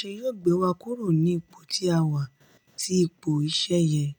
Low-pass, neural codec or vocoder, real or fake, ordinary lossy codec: 19.8 kHz; none; real; none